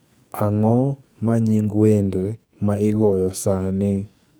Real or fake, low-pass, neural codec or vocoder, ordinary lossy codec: fake; none; codec, 44.1 kHz, 2.6 kbps, SNAC; none